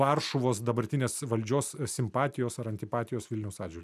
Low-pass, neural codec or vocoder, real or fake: 14.4 kHz; none; real